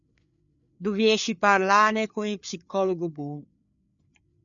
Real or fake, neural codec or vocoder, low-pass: fake; codec, 16 kHz, 4 kbps, FreqCodec, larger model; 7.2 kHz